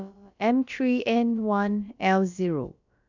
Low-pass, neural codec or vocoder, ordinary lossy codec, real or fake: 7.2 kHz; codec, 16 kHz, about 1 kbps, DyCAST, with the encoder's durations; none; fake